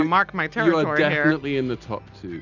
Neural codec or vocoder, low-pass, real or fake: none; 7.2 kHz; real